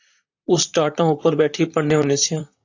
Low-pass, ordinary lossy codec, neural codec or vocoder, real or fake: 7.2 kHz; AAC, 48 kbps; vocoder, 44.1 kHz, 128 mel bands, Pupu-Vocoder; fake